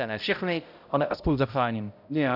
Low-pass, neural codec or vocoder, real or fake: 5.4 kHz; codec, 16 kHz, 0.5 kbps, X-Codec, HuBERT features, trained on balanced general audio; fake